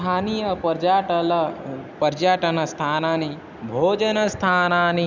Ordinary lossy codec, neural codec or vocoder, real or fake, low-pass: none; none; real; 7.2 kHz